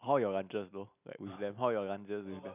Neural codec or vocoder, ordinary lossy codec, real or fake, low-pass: none; none; real; 3.6 kHz